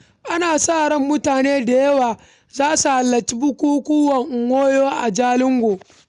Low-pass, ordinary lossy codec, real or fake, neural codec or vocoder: 10.8 kHz; none; real; none